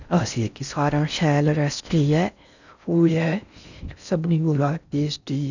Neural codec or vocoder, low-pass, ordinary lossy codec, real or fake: codec, 16 kHz in and 24 kHz out, 0.6 kbps, FocalCodec, streaming, 4096 codes; 7.2 kHz; none; fake